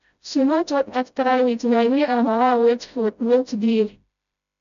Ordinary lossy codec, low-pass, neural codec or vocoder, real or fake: none; 7.2 kHz; codec, 16 kHz, 0.5 kbps, FreqCodec, smaller model; fake